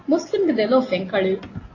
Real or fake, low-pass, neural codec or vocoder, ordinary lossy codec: real; 7.2 kHz; none; AAC, 32 kbps